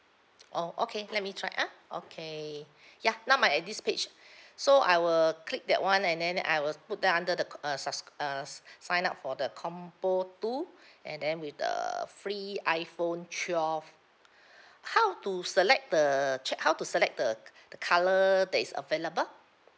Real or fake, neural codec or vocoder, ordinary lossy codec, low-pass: real; none; none; none